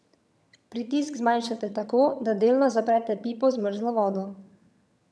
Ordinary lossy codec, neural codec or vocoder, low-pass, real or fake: none; vocoder, 22.05 kHz, 80 mel bands, HiFi-GAN; none; fake